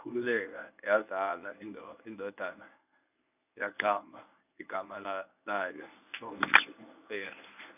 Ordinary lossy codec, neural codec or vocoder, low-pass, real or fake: none; codec, 24 kHz, 0.9 kbps, WavTokenizer, medium speech release version 1; 3.6 kHz; fake